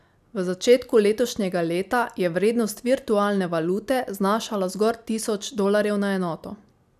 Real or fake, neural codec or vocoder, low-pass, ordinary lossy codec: real; none; 14.4 kHz; none